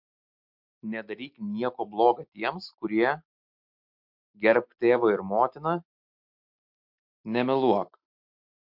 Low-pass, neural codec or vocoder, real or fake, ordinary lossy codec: 5.4 kHz; none; real; MP3, 48 kbps